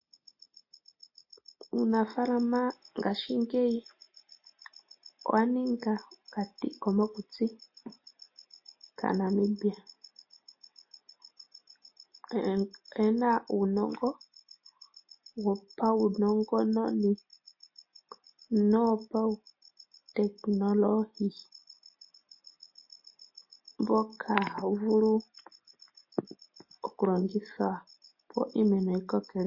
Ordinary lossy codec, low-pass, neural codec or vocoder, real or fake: MP3, 32 kbps; 5.4 kHz; none; real